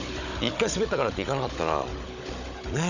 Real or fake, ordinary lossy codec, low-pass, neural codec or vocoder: fake; none; 7.2 kHz; codec, 16 kHz, 16 kbps, FunCodec, trained on Chinese and English, 50 frames a second